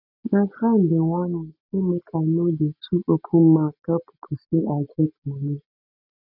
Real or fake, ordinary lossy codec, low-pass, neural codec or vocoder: real; none; 5.4 kHz; none